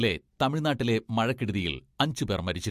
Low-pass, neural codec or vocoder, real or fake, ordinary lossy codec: 14.4 kHz; none; real; MP3, 64 kbps